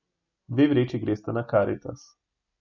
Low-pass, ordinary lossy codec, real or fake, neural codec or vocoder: 7.2 kHz; none; real; none